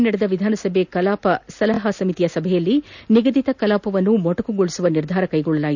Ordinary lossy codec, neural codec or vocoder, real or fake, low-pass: none; none; real; 7.2 kHz